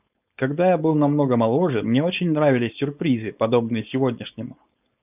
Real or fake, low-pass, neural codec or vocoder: fake; 3.6 kHz; codec, 16 kHz, 4.8 kbps, FACodec